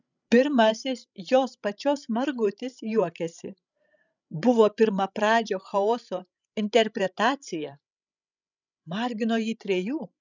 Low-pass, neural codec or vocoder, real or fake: 7.2 kHz; codec, 16 kHz, 16 kbps, FreqCodec, larger model; fake